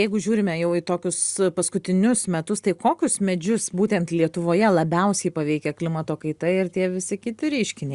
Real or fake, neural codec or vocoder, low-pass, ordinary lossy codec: real; none; 10.8 kHz; Opus, 64 kbps